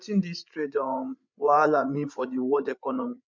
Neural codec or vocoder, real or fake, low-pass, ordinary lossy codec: codec, 16 kHz, 8 kbps, FreqCodec, larger model; fake; 7.2 kHz; MP3, 64 kbps